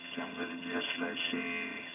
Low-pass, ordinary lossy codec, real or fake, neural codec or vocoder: 3.6 kHz; none; fake; vocoder, 22.05 kHz, 80 mel bands, HiFi-GAN